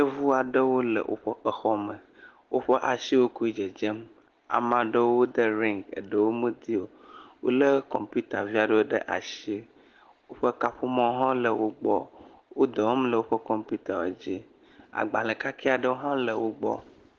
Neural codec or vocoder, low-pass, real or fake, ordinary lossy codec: none; 7.2 kHz; real; Opus, 16 kbps